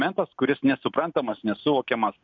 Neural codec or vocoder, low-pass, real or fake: none; 7.2 kHz; real